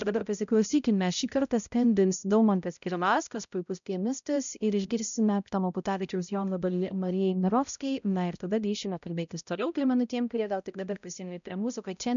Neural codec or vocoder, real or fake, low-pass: codec, 16 kHz, 0.5 kbps, X-Codec, HuBERT features, trained on balanced general audio; fake; 7.2 kHz